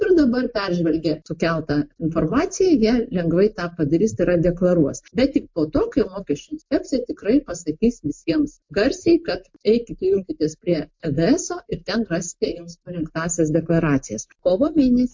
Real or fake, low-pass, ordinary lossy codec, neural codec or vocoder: real; 7.2 kHz; MP3, 48 kbps; none